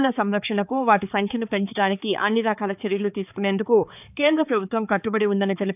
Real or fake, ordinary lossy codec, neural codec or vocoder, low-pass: fake; none; codec, 16 kHz, 4 kbps, X-Codec, HuBERT features, trained on balanced general audio; 3.6 kHz